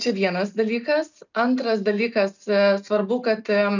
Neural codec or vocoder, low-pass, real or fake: none; 7.2 kHz; real